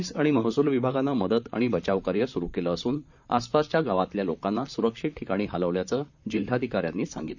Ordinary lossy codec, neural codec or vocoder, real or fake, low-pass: none; vocoder, 44.1 kHz, 128 mel bands, Pupu-Vocoder; fake; 7.2 kHz